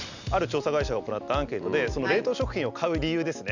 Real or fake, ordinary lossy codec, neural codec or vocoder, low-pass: real; none; none; 7.2 kHz